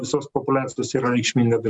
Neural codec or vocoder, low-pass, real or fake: none; 10.8 kHz; real